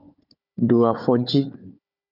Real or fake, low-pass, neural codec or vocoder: fake; 5.4 kHz; codec, 16 kHz, 4 kbps, FunCodec, trained on Chinese and English, 50 frames a second